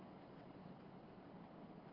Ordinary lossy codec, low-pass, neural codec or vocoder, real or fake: Opus, 16 kbps; 5.4 kHz; codec, 16 kHz in and 24 kHz out, 1 kbps, XY-Tokenizer; fake